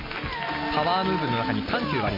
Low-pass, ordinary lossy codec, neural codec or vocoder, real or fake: 5.4 kHz; MP3, 24 kbps; none; real